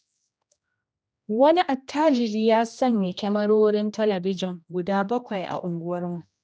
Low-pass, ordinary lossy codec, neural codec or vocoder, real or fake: none; none; codec, 16 kHz, 1 kbps, X-Codec, HuBERT features, trained on general audio; fake